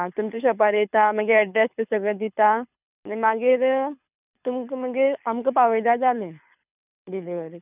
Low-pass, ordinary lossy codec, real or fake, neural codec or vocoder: 3.6 kHz; none; fake; codec, 24 kHz, 6 kbps, HILCodec